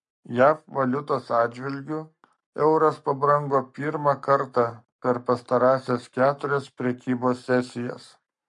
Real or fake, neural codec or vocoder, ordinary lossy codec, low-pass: fake; codec, 44.1 kHz, 7.8 kbps, Pupu-Codec; MP3, 48 kbps; 10.8 kHz